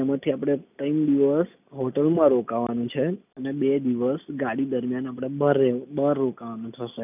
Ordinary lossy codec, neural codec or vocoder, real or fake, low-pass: none; none; real; 3.6 kHz